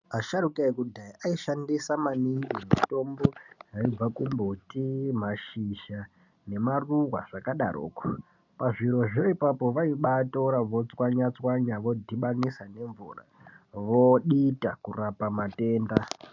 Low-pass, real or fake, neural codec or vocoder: 7.2 kHz; real; none